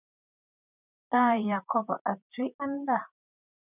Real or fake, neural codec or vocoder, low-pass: fake; vocoder, 44.1 kHz, 128 mel bands, Pupu-Vocoder; 3.6 kHz